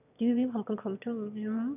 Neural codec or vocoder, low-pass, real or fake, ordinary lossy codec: autoencoder, 22.05 kHz, a latent of 192 numbers a frame, VITS, trained on one speaker; 3.6 kHz; fake; none